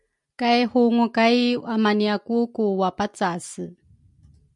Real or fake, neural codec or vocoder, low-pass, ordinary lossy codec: real; none; 10.8 kHz; MP3, 96 kbps